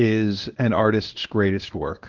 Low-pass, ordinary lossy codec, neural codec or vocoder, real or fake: 7.2 kHz; Opus, 16 kbps; codec, 24 kHz, 0.9 kbps, WavTokenizer, medium speech release version 1; fake